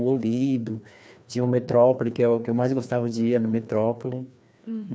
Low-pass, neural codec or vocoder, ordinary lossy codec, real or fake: none; codec, 16 kHz, 2 kbps, FreqCodec, larger model; none; fake